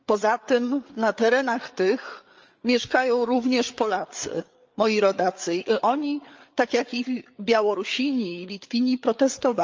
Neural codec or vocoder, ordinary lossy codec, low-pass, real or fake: codec, 16 kHz, 8 kbps, FreqCodec, larger model; Opus, 24 kbps; 7.2 kHz; fake